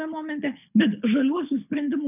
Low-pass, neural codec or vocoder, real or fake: 3.6 kHz; codec, 24 kHz, 6 kbps, HILCodec; fake